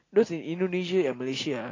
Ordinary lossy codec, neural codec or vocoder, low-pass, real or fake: AAC, 32 kbps; none; 7.2 kHz; real